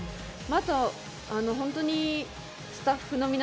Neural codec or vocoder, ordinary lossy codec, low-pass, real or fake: none; none; none; real